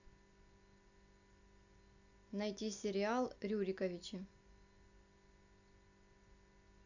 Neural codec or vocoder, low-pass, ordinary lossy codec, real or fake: none; 7.2 kHz; none; real